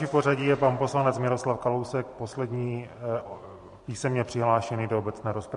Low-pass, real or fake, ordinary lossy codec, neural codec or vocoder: 14.4 kHz; fake; MP3, 48 kbps; vocoder, 44.1 kHz, 128 mel bands every 512 samples, BigVGAN v2